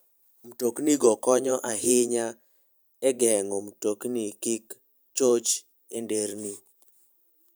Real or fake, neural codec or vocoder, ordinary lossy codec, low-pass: fake; vocoder, 44.1 kHz, 128 mel bands every 512 samples, BigVGAN v2; none; none